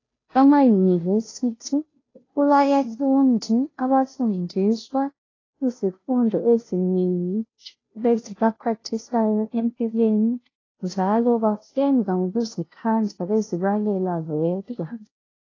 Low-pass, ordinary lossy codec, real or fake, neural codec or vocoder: 7.2 kHz; AAC, 32 kbps; fake; codec, 16 kHz, 0.5 kbps, FunCodec, trained on Chinese and English, 25 frames a second